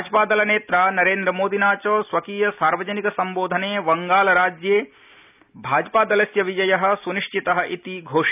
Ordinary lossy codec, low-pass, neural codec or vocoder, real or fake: none; 3.6 kHz; none; real